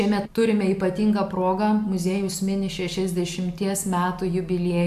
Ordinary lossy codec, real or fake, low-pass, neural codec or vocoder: AAC, 96 kbps; real; 14.4 kHz; none